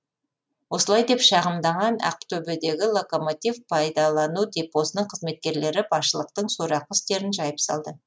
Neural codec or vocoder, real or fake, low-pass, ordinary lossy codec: none; real; none; none